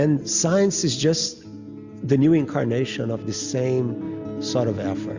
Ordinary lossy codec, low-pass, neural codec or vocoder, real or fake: Opus, 64 kbps; 7.2 kHz; none; real